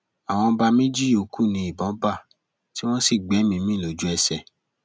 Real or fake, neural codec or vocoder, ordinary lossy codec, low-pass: real; none; none; none